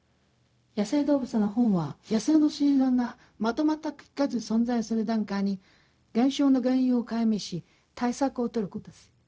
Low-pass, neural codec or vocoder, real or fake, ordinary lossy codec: none; codec, 16 kHz, 0.4 kbps, LongCat-Audio-Codec; fake; none